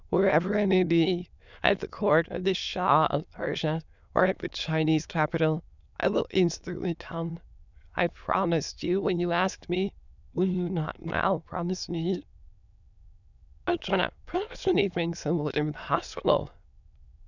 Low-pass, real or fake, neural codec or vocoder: 7.2 kHz; fake; autoencoder, 22.05 kHz, a latent of 192 numbers a frame, VITS, trained on many speakers